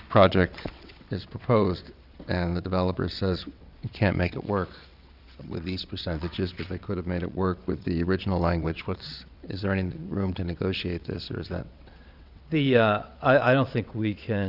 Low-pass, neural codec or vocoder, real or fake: 5.4 kHz; vocoder, 22.05 kHz, 80 mel bands, WaveNeXt; fake